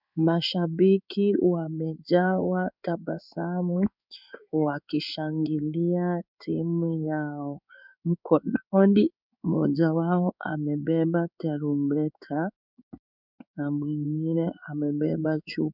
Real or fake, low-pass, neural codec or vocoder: fake; 5.4 kHz; codec, 16 kHz in and 24 kHz out, 1 kbps, XY-Tokenizer